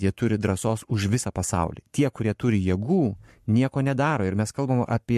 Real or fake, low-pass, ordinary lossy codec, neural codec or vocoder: fake; 14.4 kHz; MP3, 64 kbps; codec, 44.1 kHz, 7.8 kbps, Pupu-Codec